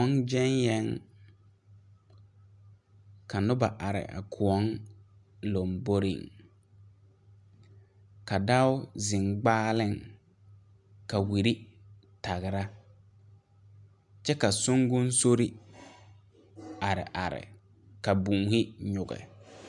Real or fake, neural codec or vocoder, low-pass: real; none; 10.8 kHz